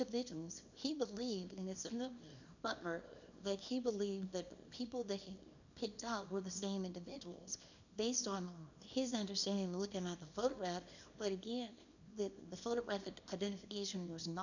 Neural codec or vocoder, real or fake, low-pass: codec, 24 kHz, 0.9 kbps, WavTokenizer, small release; fake; 7.2 kHz